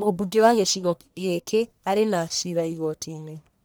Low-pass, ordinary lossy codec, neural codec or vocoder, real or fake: none; none; codec, 44.1 kHz, 1.7 kbps, Pupu-Codec; fake